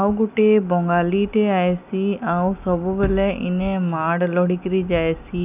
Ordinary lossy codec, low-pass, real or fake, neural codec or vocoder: none; 3.6 kHz; real; none